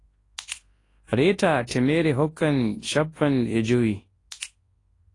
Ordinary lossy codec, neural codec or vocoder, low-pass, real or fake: AAC, 32 kbps; codec, 24 kHz, 0.9 kbps, WavTokenizer, large speech release; 10.8 kHz; fake